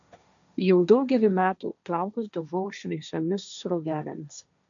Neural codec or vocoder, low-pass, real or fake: codec, 16 kHz, 1.1 kbps, Voila-Tokenizer; 7.2 kHz; fake